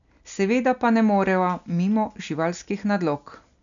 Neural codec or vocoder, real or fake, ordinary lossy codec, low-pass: none; real; none; 7.2 kHz